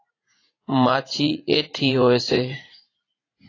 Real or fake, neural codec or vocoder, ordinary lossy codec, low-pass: fake; vocoder, 44.1 kHz, 80 mel bands, Vocos; AAC, 32 kbps; 7.2 kHz